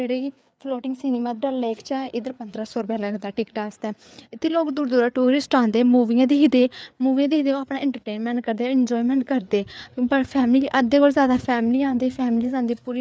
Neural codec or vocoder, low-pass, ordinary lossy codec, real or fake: codec, 16 kHz, 4 kbps, FreqCodec, larger model; none; none; fake